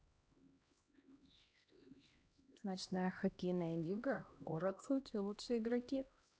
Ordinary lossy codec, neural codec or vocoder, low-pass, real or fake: none; codec, 16 kHz, 1 kbps, X-Codec, HuBERT features, trained on LibriSpeech; none; fake